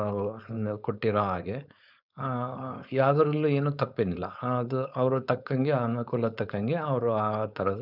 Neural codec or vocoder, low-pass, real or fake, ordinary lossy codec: codec, 16 kHz, 4.8 kbps, FACodec; 5.4 kHz; fake; none